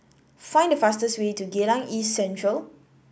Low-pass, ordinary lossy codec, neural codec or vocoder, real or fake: none; none; none; real